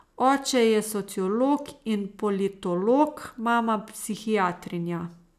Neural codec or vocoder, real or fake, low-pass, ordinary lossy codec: none; real; 14.4 kHz; none